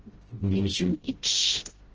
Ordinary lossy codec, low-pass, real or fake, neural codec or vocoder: Opus, 16 kbps; 7.2 kHz; fake; codec, 16 kHz, 0.5 kbps, FreqCodec, smaller model